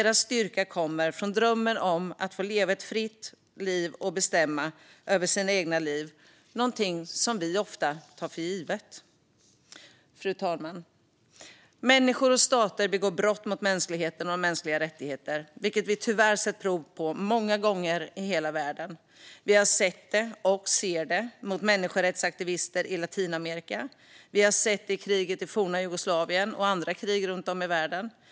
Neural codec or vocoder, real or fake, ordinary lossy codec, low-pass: none; real; none; none